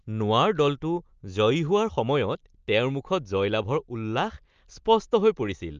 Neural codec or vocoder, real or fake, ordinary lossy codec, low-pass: none; real; Opus, 24 kbps; 7.2 kHz